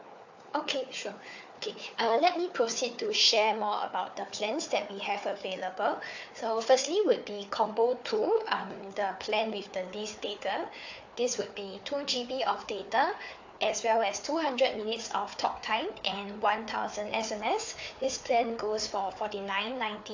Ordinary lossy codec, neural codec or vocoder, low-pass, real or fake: AAC, 48 kbps; codec, 16 kHz, 4 kbps, FunCodec, trained on Chinese and English, 50 frames a second; 7.2 kHz; fake